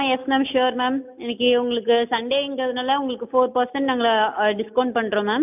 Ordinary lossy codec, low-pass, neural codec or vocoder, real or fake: none; 3.6 kHz; none; real